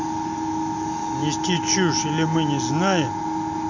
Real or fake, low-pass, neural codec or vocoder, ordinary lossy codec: real; 7.2 kHz; none; none